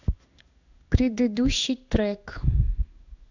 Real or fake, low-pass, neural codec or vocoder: fake; 7.2 kHz; codec, 16 kHz in and 24 kHz out, 1 kbps, XY-Tokenizer